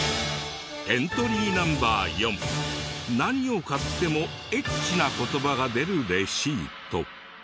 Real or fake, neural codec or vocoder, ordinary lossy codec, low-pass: real; none; none; none